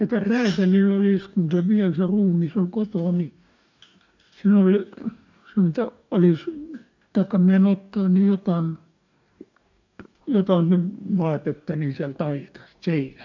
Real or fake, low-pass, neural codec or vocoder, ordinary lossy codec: fake; 7.2 kHz; codec, 44.1 kHz, 2.6 kbps, DAC; MP3, 64 kbps